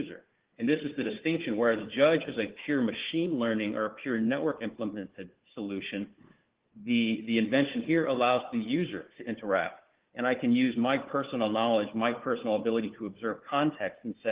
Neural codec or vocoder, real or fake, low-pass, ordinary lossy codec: codec, 16 kHz, 4 kbps, FunCodec, trained on Chinese and English, 50 frames a second; fake; 3.6 kHz; Opus, 16 kbps